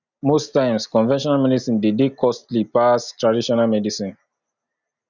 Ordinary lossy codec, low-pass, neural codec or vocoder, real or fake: none; 7.2 kHz; none; real